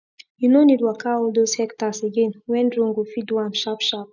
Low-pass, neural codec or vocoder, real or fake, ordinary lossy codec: 7.2 kHz; none; real; none